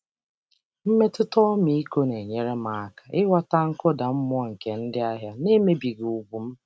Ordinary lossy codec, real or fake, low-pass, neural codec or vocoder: none; real; none; none